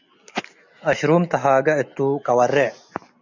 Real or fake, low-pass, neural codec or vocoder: real; 7.2 kHz; none